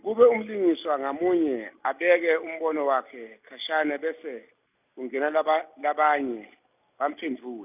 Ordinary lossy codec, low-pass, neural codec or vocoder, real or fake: none; 3.6 kHz; none; real